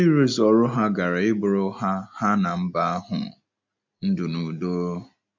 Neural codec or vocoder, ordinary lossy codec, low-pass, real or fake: none; MP3, 64 kbps; 7.2 kHz; real